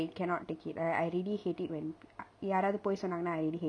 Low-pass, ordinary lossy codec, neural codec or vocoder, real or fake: 9.9 kHz; none; none; real